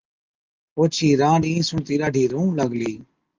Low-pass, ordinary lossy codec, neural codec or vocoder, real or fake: 7.2 kHz; Opus, 32 kbps; none; real